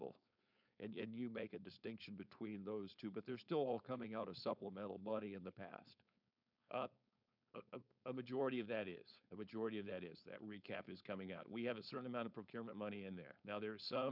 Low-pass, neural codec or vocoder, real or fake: 5.4 kHz; codec, 16 kHz, 4.8 kbps, FACodec; fake